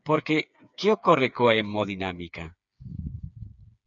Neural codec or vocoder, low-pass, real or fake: codec, 16 kHz, 4 kbps, FreqCodec, smaller model; 7.2 kHz; fake